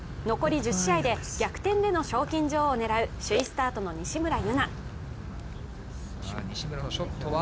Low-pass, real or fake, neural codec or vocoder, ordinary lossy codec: none; real; none; none